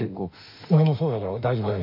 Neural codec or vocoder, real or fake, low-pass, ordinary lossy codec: autoencoder, 48 kHz, 32 numbers a frame, DAC-VAE, trained on Japanese speech; fake; 5.4 kHz; MP3, 48 kbps